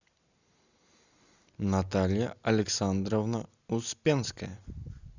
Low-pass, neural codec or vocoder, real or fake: 7.2 kHz; none; real